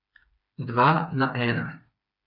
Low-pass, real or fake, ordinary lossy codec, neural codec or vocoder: 5.4 kHz; fake; none; codec, 16 kHz, 4 kbps, FreqCodec, smaller model